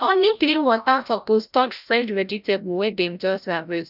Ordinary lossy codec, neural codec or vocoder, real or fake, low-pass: none; codec, 16 kHz, 0.5 kbps, FreqCodec, larger model; fake; 5.4 kHz